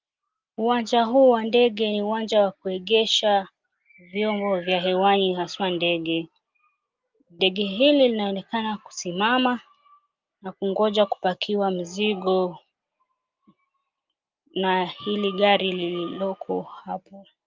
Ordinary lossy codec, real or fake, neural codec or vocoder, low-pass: Opus, 24 kbps; real; none; 7.2 kHz